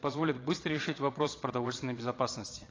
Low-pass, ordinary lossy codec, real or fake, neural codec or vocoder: 7.2 kHz; AAC, 32 kbps; fake; vocoder, 22.05 kHz, 80 mel bands, Vocos